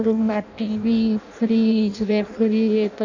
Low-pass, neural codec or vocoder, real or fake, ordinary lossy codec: 7.2 kHz; codec, 16 kHz in and 24 kHz out, 0.6 kbps, FireRedTTS-2 codec; fake; none